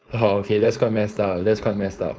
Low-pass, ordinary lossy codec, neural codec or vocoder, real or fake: none; none; codec, 16 kHz, 4.8 kbps, FACodec; fake